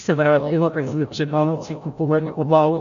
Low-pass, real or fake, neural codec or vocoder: 7.2 kHz; fake; codec, 16 kHz, 0.5 kbps, FreqCodec, larger model